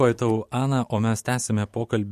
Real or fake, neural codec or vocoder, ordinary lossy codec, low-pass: real; none; MP3, 64 kbps; 14.4 kHz